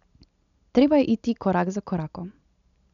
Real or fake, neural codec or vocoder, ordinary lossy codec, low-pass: real; none; none; 7.2 kHz